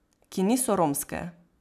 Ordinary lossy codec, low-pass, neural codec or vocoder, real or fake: none; 14.4 kHz; none; real